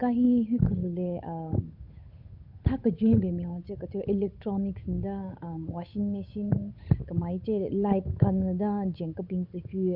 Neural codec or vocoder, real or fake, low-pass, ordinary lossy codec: codec, 16 kHz, 8 kbps, FunCodec, trained on Chinese and English, 25 frames a second; fake; 5.4 kHz; MP3, 48 kbps